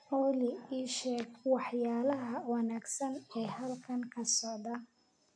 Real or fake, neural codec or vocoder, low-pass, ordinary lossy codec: real; none; 9.9 kHz; none